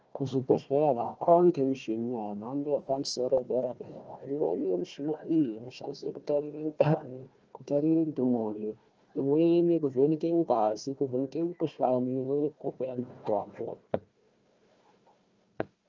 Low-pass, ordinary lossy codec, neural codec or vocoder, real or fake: 7.2 kHz; Opus, 24 kbps; codec, 16 kHz, 1 kbps, FunCodec, trained on Chinese and English, 50 frames a second; fake